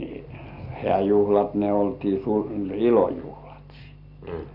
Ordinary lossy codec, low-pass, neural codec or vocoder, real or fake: none; 5.4 kHz; none; real